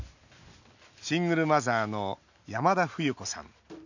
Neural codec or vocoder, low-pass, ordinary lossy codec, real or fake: none; 7.2 kHz; none; real